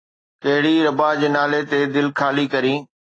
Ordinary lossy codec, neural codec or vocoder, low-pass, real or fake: AAC, 32 kbps; none; 9.9 kHz; real